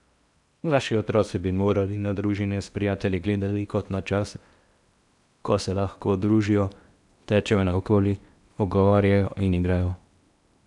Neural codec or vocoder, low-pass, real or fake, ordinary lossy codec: codec, 16 kHz in and 24 kHz out, 0.8 kbps, FocalCodec, streaming, 65536 codes; 10.8 kHz; fake; none